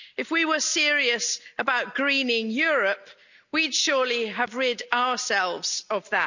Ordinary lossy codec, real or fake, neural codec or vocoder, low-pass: none; real; none; 7.2 kHz